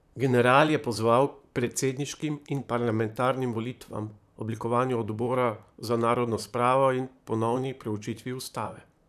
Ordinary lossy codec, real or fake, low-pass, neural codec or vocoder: none; fake; 14.4 kHz; vocoder, 44.1 kHz, 128 mel bands, Pupu-Vocoder